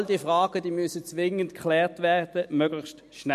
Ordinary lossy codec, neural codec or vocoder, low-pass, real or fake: MP3, 64 kbps; none; 14.4 kHz; real